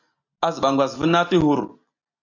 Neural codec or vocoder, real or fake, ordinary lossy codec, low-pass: none; real; AAC, 48 kbps; 7.2 kHz